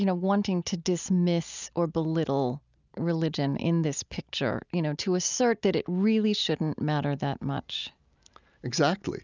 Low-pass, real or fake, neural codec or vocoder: 7.2 kHz; real; none